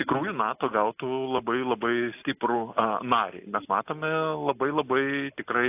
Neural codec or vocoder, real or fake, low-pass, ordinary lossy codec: none; real; 3.6 kHz; AAC, 32 kbps